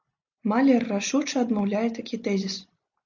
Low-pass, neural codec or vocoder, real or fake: 7.2 kHz; none; real